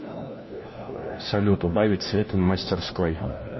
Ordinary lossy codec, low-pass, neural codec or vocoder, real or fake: MP3, 24 kbps; 7.2 kHz; codec, 16 kHz, 1 kbps, FunCodec, trained on LibriTTS, 50 frames a second; fake